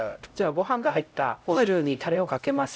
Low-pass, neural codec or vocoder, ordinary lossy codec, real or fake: none; codec, 16 kHz, 0.5 kbps, X-Codec, HuBERT features, trained on LibriSpeech; none; fake